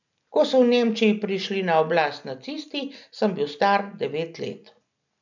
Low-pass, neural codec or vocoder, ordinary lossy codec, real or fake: 7.2 kHz; none; none; real